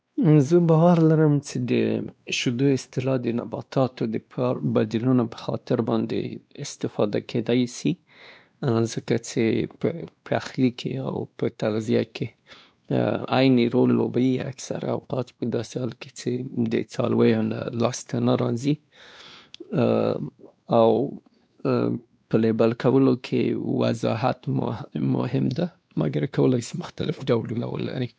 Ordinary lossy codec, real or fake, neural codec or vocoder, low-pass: none; fake; codec, 16 kHz, 2 kbps, X-Codec, WavLM features, trained on Multilingual LibriSpeech; none